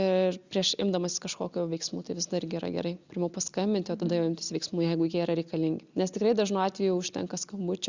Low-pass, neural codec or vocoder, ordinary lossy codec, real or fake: 7.2 kHz; none; Opus, 64 kbps; real